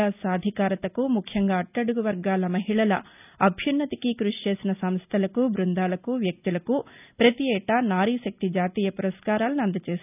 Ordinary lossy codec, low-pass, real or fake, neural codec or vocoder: none; 3.6 kHz; real; none